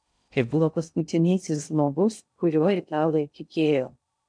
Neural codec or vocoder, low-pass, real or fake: codec, 16 kHz in and 24 kHz out, 0.6 kbps, FocalCodec, streaming, 2048 codes; 9.9 kHz; fake